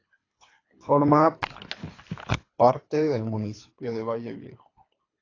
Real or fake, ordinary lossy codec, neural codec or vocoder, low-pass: fake; AAC, 32 kbps; codec, 24 kHz, 3 kbps, HILCodec; 7.2 kHz